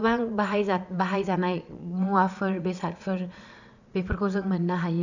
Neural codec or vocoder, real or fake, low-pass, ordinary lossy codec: vocoder, 22.05 kHz, 80 mel bands, Vocos; fake; 7.2 kHz; none